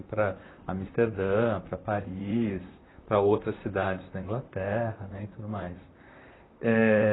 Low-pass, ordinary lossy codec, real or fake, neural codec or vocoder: 7.2 kHz; AAC, 16 kbps; fake; vocoder, 44.1 kHz, 128 mel bands, Pupu-Vocoder